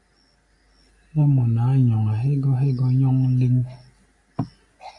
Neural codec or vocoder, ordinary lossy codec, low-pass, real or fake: none; MP3, 48 kbps; 10.8 kHz; real